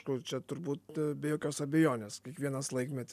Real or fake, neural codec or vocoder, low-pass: real; none; 14.4 kHz